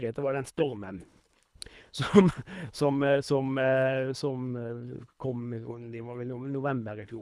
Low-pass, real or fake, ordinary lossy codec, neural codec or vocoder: none; fake; none; codec, 24 kHz, 3 kbps, HILCodec